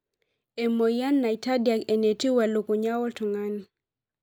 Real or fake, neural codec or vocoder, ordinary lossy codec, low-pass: real; none; none; none